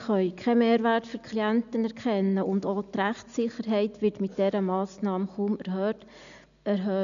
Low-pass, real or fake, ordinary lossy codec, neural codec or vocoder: 7.2 kHz; real; none; none